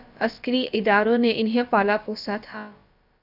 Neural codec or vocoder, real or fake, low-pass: codec, 16 kHz, about 1 kbps, DyCAST, with the encoder's durations; fake; 5.4 kHz